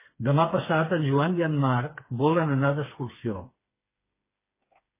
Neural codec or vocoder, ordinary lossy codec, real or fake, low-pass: codec, 16 kHz, 4 kbps, FreqCodec, smaller model; MP3, 16 kbps; fake; 3.6 kHz